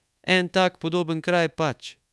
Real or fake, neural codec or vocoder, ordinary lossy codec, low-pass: fake; codec, 24 kHz, 1.2 kbps, DualCodec; none; none